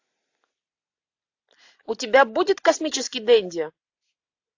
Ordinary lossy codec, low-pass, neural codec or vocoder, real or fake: AAC, 48 kbps; 7.2 kHz; none; real